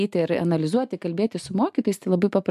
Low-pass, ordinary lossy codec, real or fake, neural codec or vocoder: 14.4 kHz; MP3, 96 kbps; real; none